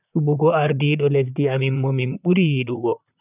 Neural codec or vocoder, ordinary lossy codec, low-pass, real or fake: vocoder, 44.1 kHz, 128 mel bands, Pupu-Vocoder; none; 3.6 kHz; fake